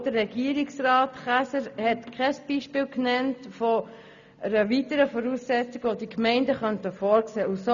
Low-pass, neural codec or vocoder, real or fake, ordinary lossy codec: 7.2 kHz; none; real; none